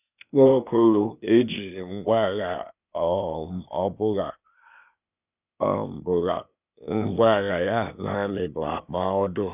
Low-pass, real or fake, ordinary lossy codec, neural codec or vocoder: 3.6 kHz; fake; none; codec, 16 kHz, 0.8 kbps, ZipCodec